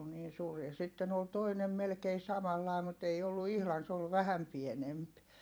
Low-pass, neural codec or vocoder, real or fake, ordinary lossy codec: none; vocoder, 44.1 kHz, 128 mel bands every 256 samples, BigVGAN v2; fake; none